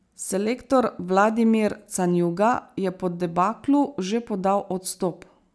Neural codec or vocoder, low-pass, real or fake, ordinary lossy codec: none; none; real; none